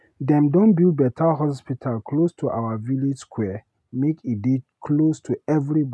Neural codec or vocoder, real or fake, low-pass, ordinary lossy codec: none; real; none; none